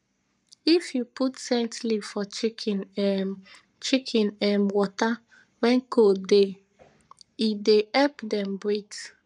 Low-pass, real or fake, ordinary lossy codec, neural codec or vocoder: 10.8 kHz; fake; none; codec, 44.1 kHz, 7.8 kbps, Pupu-Codec